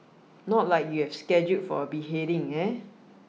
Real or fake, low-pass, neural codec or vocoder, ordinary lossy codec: real; none; none; none